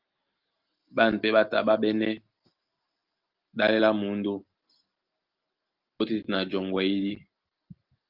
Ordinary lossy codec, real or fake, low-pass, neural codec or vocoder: Opus, 24 kbps; real; 5.4 kHz; none